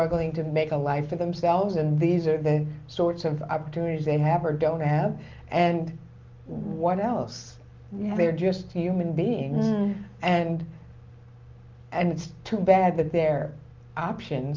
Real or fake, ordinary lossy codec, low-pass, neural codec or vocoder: real; Opus, 32 kbps; 7.2 kHz; none